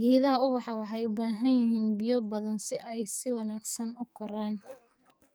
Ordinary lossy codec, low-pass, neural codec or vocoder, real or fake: none; none; codec, 44.1 kHz, 2.6 kbps, SNAC; fake